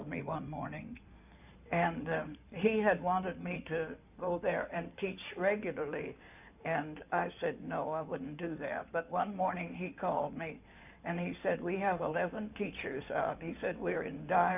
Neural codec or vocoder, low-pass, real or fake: codec, 16 kHz in and 24 kHz out, 2.2 kbps, FireRedTTS-2 codec; 3.6 kHz; fake